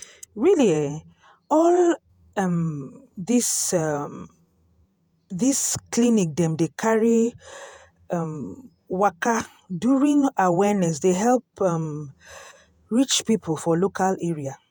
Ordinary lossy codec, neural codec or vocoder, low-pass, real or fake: none; vocoder, 48 kHz, 128 mel bands, Vocos; none; fake